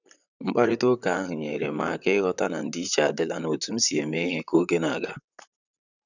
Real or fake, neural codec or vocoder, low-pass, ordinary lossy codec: fake; vocoder, 44.1 kHz, 128 mel bands, Pupu-Vocoder; 7.2 kHz; none